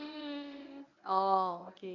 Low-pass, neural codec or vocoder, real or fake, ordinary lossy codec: 7.2 kHz; codec, 24 kHz, 0.9 kbps, WavTokenizer, medium speech release version 1; fake; none